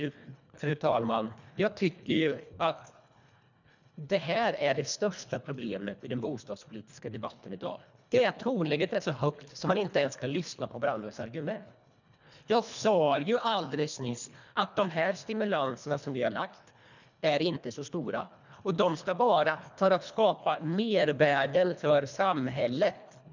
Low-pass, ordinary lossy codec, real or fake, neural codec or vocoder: 7.2 kHz; none; fake; codec, 24 kHz, 1.5 kbps, HILCodec